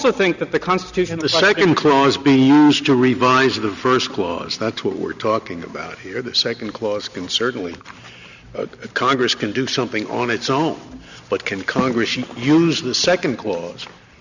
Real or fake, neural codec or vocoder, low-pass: real; none; 7.2 kHz